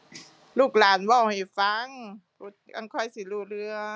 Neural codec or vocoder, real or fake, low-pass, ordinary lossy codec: none; real; none; none